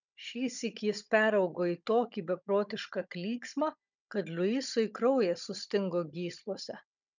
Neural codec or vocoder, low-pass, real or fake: codec, 16 kHz, 16 kbps, FunCodec, trained on Chinese and English, 50 frames a second; 7.2 kHz; fake